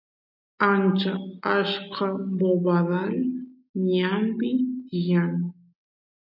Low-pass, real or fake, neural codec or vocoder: 5.4 kHz; real; none